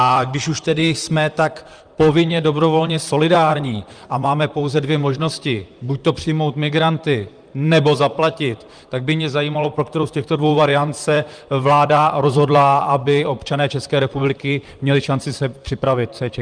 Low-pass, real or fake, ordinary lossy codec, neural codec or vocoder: 9.9 kHz; fake; Opus, 32 kbps; vocoder, 44.1 kHz, 128 mel bands, Pupu-Vocoder